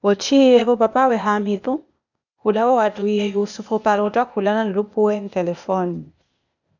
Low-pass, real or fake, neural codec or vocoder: 7.2 kHz; fake; codec, 16 kHz, 0.8 kbps, ZipCodec